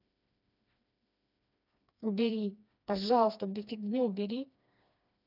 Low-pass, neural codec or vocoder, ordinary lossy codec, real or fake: 5.4 kHz; codec, 16 kHz, 2 kbps, FreqCodec, smaller model; none; fake